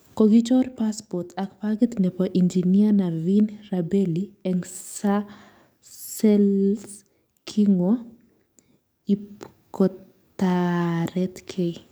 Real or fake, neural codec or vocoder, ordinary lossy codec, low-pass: fake; codec, 44.1 kHz, 7.8 kbps, DAC; none; none